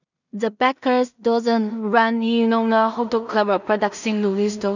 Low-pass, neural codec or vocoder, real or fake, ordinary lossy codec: 7.2 kHz; codec, 16 kHz in and 24 kHz out, 0.4 kbps, LongCat-Audio-Codec, two codebook decoder; fake; none